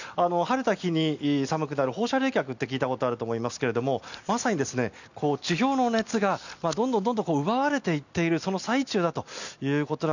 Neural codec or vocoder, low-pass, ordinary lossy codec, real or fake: none; 7.2 kHz; none; real